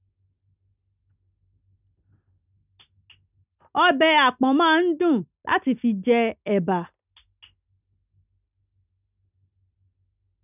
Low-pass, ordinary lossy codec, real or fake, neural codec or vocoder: 3.6 kHz; none; real; none